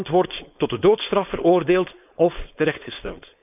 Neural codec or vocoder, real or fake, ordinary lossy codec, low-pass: codec, 16 kHz, 4.8 kbps, FACodec; fake; none; 3.6 kHz